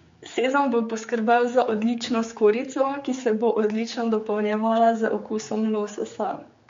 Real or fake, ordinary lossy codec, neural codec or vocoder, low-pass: fake; MP3, 48 kbps; codec, 16 kHz, 4 kbps, X-Codec, HuBERT features, trained on general audio; 7.2 kHz